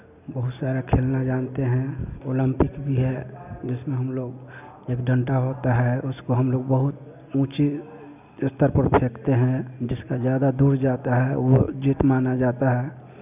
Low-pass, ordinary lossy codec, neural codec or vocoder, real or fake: 3.6 kHz; none; none; real